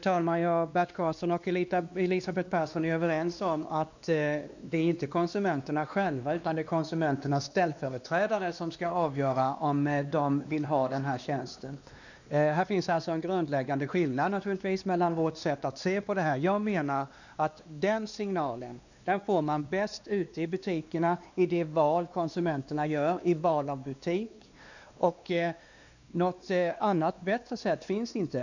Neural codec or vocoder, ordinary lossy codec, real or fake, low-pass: codec, 16 kHz, 2 kbps, X-Codec, WavLM features, trained on Multilingual LibriSpeech; none; fake; 7.2 kHz